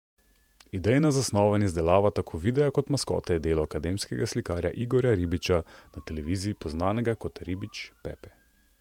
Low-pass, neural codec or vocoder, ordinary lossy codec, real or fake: 19.8 kHz; vocoder, 48 kHz, 128 mel bands, Vocos; MP3, 96 kbps; fake